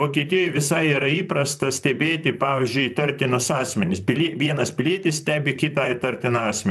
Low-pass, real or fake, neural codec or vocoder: 14.4 kHz; fake; vocoder, 44.1 kHz, 128 mel bands, Pupu-Vocoder